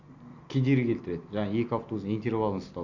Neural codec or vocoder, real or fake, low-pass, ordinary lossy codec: none; real; 7.2 kHz; none